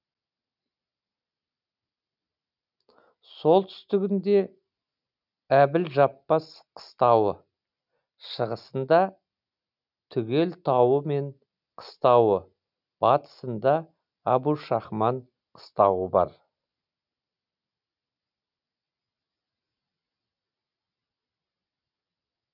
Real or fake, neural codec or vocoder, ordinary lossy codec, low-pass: real; none; none; 5.4 kHz